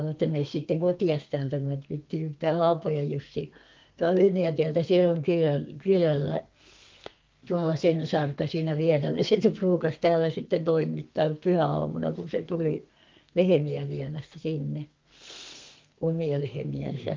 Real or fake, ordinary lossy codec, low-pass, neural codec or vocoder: fake; Opus, 32 kbps; 7.2 kHz; codec, 32 kHz, 1.9 kbps, SNAC